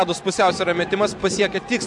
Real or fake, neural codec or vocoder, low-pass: real; none; 10.8 kHz